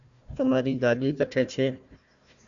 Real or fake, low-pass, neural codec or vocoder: fake; 7.2 kHz; codec, 16 kHz, 1 kbps, FunCodec, trained on Chinese and English, 50 frames a second